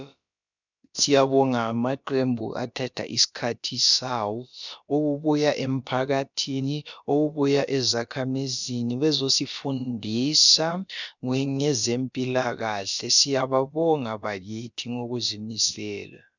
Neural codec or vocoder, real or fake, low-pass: codec, 16 kHz, about 1 kbps, DyCAST, with the encoder's durations; fake; 7.2 kHz